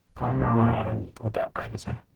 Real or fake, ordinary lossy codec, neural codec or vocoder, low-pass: fake; Opus, 16 kbps; codec, 44.1 kHz, 0.9 kbps, DAC; 19.8 kHz